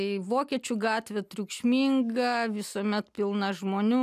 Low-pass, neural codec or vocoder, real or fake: 14.4 kHz; none; real